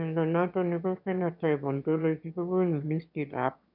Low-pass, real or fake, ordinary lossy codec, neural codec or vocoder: 5.4 kHz; fake; none; autoencoder, 22.05 kHz, a latent of 192 numbers a frame, VITS, trained on one speaker